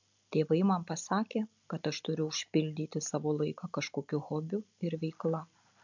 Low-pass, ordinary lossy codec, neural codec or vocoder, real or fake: 7.2 kHz; MP3, 64 kbps; none; real